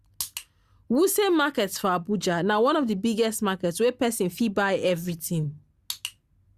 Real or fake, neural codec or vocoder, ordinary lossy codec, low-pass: real; none; Opus, 64 kbps; 14.4 kHz